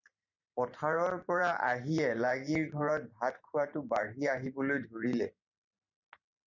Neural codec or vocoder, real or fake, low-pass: vocoder, 44.1 kHz, 128 mel bands every 512 samples, BigVGAN v2; fake; 7.2 kHz